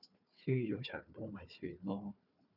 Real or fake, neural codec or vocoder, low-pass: fake; codec, 16 kHz, 4 kbps, FunCodec, trained on Chinese and English, 50 frames a second; 5.4 kHz